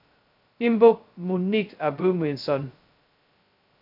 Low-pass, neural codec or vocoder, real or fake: 5.4 kHz; codec, 16 kHz, 0.2 kbps, FocalCodec; fake